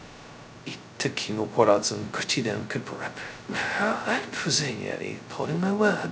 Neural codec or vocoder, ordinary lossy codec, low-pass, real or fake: codec, 16 kHz, 0.2 kbps, FocalCodec; none; none; fake